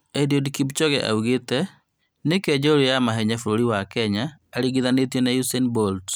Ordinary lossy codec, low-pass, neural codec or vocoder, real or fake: none; none; none; real